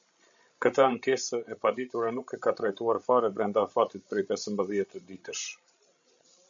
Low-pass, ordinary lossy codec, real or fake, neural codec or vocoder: 7.2 kHz; MP3, 64 kbps; fake; codec, 16 kHz, 16 kbps, FreqCodec, larger model